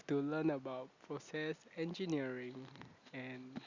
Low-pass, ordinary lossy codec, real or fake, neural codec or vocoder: 7.2 kHz; Opus, 64 kbps; real; none